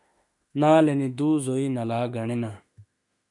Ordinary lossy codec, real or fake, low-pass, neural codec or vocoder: MP3, 96 kbps; fake; 10.8 kHz; autoencoder, 48 kHz, 32 numbers a frame, DAC-VAE, trained on Japanese speech